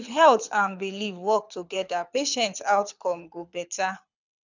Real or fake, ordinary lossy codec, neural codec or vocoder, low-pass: fake; none; codec, 24 kHz, 6 kbps, HILCodec; 7.2 kHz